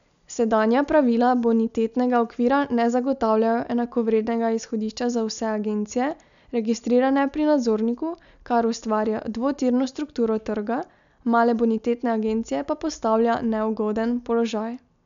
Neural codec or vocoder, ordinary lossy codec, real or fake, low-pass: none; none; real; 7.2 kHz